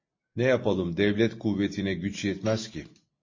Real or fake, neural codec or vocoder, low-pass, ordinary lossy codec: real; none; 7.2 kHz; MP3, 32 kbps